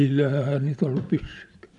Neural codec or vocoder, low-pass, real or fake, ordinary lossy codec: none; 10.8 kHz; real; none